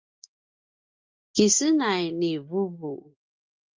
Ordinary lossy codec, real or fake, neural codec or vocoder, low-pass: Opus, 32 kbps; real; none; 7.2 kHz